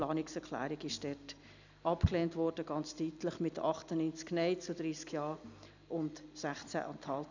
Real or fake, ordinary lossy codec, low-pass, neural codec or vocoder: real; none; 7.2 kHz; none